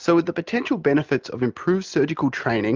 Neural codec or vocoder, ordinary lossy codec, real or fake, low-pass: none; Opus, 24 kbps; real; 7.2 kHz